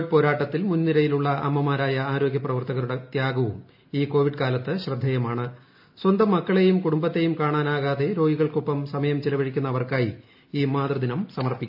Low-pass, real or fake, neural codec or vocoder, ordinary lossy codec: 5.4 kHz; real; none; AAC, 48 kbps